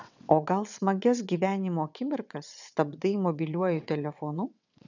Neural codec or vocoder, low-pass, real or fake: vocoder, 22.05 kHz, 80 mel bands, WaveNeXt; 7.2 kHz; fake